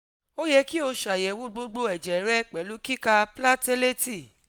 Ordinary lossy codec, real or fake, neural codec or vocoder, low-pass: none; real; none; none